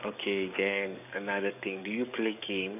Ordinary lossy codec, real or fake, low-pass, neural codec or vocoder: none; fake; 3.6 kHz; codec, 44.1 kHz, 7.8 kbps, DAC